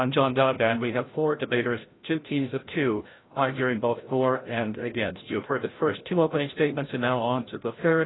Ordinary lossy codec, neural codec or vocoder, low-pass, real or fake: AAC, 16 kbps; codec, 16 kHz, 0.5 kbps, FreqCodec, larger model; 7.2 kHz; fake